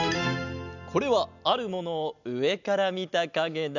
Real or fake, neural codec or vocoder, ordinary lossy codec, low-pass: real; none; none; 7.2 kHz